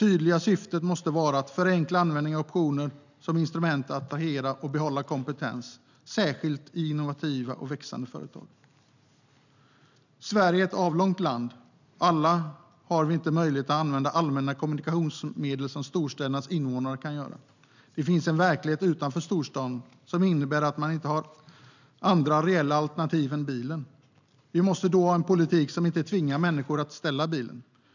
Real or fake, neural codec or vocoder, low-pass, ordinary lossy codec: real; none; 7.2 kHz; none